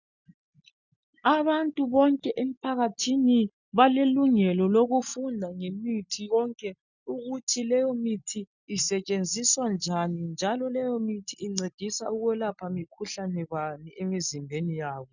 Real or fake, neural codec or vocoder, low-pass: real; none; 7.2 kHz